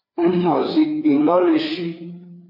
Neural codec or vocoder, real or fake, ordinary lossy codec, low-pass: codec, 16 kHz, 4 kbps, FreqCodec, larger model; fake; MP3, 32 kbps; 5.4 kHz